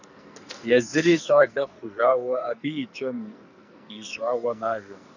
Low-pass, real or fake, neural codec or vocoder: 7.2 kHz; fake; codec, 44.1 kHz, 2.6 kbps, SNAC